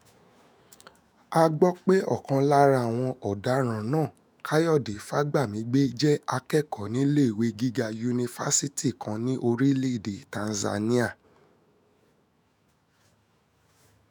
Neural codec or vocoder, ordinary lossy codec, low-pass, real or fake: autoencoder, 48 kHz, 128 numbers a frame, DAC-VAE, trained on Japanese speech; none; none; fake